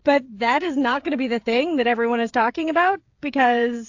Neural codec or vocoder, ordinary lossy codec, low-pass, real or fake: codec, 16 kHz, 8 kbps, FreqCodec, smaller model; AAC, 48 kbps; 7.2 kHz; fake